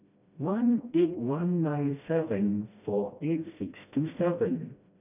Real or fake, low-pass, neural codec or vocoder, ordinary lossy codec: fake; 3.6 kHz; codec, 16 kHz, 1 kbps, FreqCodec, smaller model; none